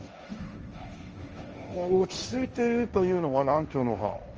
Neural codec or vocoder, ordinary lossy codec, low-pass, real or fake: codec, 16 kHz, 1.1 kbps, Voila-Tokenizer; Opus, 24 kbps; 7.2 kHz; fake